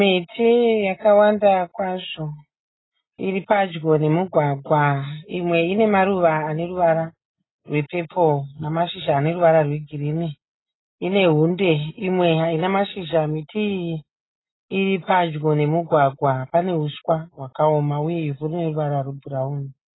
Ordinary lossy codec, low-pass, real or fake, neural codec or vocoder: AAC, 16 kbps; 7.2 kHz; real; none